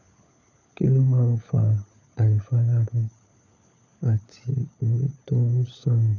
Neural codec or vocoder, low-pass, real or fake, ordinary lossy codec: codec, 16 kHz, 4 kbps, FunCodec, trained on LibriTTS, 50 frames a second; 7.2 kHz; fake; MP3, 64 kbps